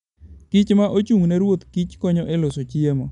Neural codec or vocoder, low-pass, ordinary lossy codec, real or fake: none; 10.8 kHz; none; real